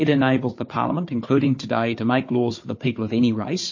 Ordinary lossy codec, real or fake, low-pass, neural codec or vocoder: MP3, 32 kbps; fake; 7.2 kHz; codec, 16 kHz, 4 kbps, FunCodec, trained on Chinese and English, 50 frames a second